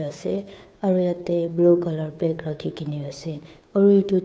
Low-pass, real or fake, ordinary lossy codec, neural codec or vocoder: none; fake; none; codec, 16 kHz, 2 kbps, FunCodec, trained on Chinese and English, 25 frames a second